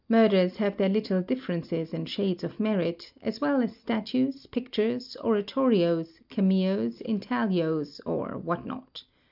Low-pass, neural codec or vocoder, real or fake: 5.4 kHz; none; real